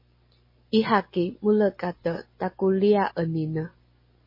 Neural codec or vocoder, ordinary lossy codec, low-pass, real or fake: none; MP3, 24 kbps; 5.4 kHz; real